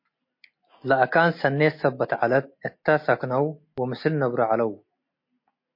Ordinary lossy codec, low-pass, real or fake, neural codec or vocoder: MP3, 32 kbps; 5.4 kHz; real; none